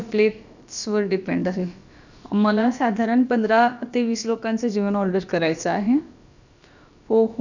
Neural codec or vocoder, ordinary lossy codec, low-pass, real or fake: codec, 16 kHz, about 1 kbps, DyCAST, with the encoder's durations; none; 7.2 kHz; fake